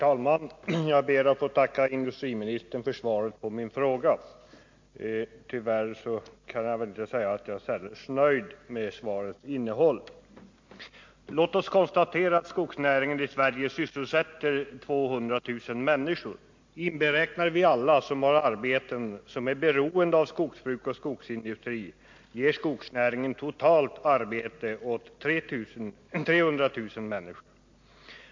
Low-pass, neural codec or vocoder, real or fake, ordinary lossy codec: 7.2 kHz; none; real; MP3, 48 kbps